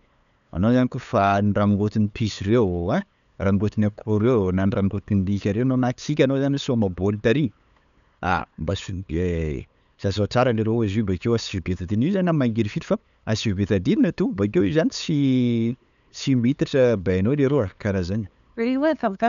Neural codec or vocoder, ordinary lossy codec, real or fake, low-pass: codec, 16 kHz, 8 kbps, FunCodec, trained on LibriTTS, 25 frames a second; none; fake; 7.2 kHz